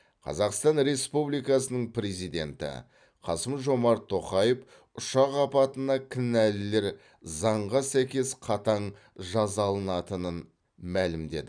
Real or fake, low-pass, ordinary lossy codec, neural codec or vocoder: fake; 9.9 kHz; none; vocoder, 44.1 kHz, 128 mel bands every 256 samples, BigVGAN v2